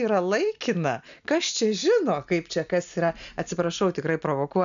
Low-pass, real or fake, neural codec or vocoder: 7.2 kHz; real; none